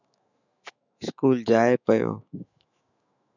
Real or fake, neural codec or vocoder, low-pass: fake; autoencoder, 48 kHz, 128 numbers a frame, DAC-VAE, trained on Japanese speech; 7.2 kHz